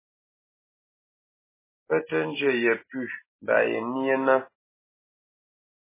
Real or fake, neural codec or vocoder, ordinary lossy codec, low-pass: real; none; MP3, 16 kbps; 3.6 kHz